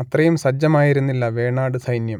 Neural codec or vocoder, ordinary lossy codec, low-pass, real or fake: none; none; 19.8 kHz; real